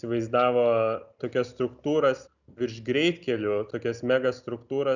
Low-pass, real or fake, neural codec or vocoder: 7.2 kHz; real; none